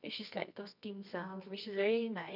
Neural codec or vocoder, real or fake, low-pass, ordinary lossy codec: codec, 24 kHz, 0.9 kbps, WavTokenizer, medium music audio release; fake; 5.4 kHz; none